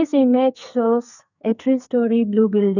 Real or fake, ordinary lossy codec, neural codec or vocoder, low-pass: fake; none; codec, 44.1 kHz, 2.6 kbps, SNAC; 7.2 kHz